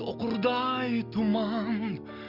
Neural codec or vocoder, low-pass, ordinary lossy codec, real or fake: none; 5.4 kHz; none; real